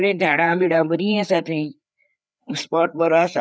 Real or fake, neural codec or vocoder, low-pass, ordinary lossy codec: fake; codec, 16 kHz, 4 kbps, FreqCodec, larger model; none; none